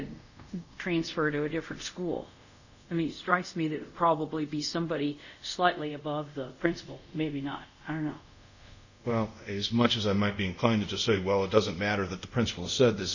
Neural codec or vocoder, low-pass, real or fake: codec, 24 kHz, 0.5 kbps, DualCodec; 7.2 kHz; fake